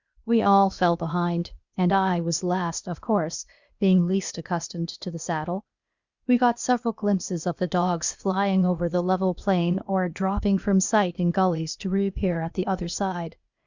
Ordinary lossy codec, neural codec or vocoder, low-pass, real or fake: Opus, 64 kbps; codec, 16 kHz, 0.8 kbps, ZipCodec; 7.2 kHz; fake